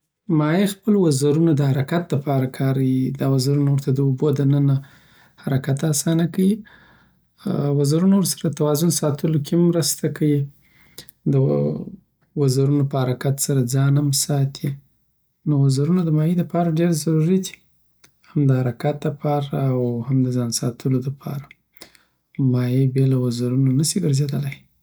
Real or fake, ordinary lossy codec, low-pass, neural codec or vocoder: real; none; none; none